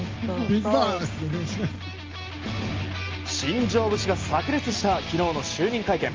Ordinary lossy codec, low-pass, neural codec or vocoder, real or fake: Opus, 32 kbps; 7.2 kHz; none; real